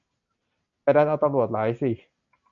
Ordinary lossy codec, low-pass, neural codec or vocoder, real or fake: MP3, 96 kbps; 7.2 kHz; none; real